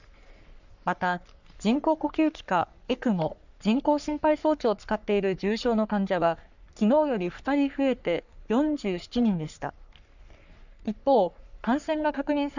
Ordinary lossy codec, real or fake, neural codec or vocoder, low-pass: none; fake; codec, 44.1 kHz, 3.4 kbps, Pupu-Codec; 7.2 kHz